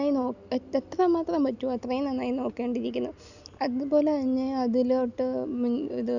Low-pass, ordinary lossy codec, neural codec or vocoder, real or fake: 7.2 kHz; none; none; real